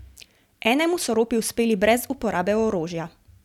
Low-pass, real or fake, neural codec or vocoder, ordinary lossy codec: 19.8 kHz; real; none; none